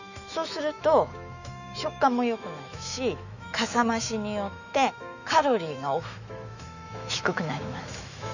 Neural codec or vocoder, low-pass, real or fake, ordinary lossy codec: autoencoder, 48 kHz, 128 numbers a frame, DAC-VAE, trained on Japanese speech; 7.2 kHz; fake; none